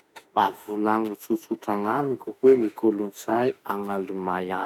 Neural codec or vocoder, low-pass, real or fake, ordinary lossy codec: autoencoder, 48 kHz, 32 numbers a frame, DAC-VAE, trained on Japanese speech; 19.8 kHz; fake; none